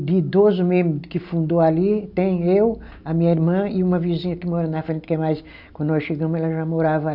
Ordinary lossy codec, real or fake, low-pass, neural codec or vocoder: none; real; 5.4 kHz; none